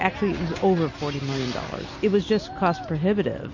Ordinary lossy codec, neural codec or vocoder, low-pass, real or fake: MP3, 32 kbps; none; 7.2 kHz; real